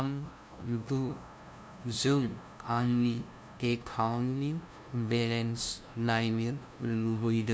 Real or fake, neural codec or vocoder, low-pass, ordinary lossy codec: fake; codec, 16 kHz, 0.5 kbps, FunCodec, trained on LibriTTS, 25 frames a second; none; none